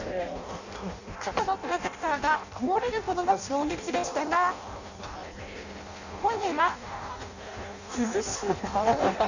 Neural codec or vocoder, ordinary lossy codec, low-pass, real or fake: codec, 16 kHz in and 24 kHz out, 0.6 kbps, FireRedTTS-2 codec; none; 7.2 kHz; fake